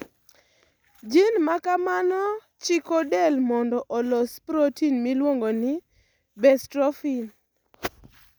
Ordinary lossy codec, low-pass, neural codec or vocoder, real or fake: none; none; none; real